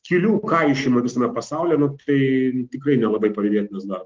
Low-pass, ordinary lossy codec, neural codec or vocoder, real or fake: 7.2 kHz; Opus, 16 kbps; none; real